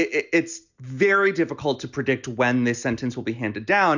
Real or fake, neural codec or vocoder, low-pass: real; none; 7.2 kHz